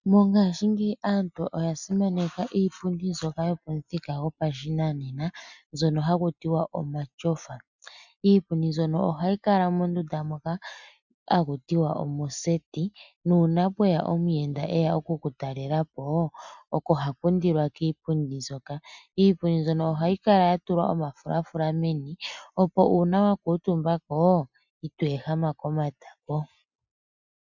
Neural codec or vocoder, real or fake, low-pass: none; real; 7.2 kHz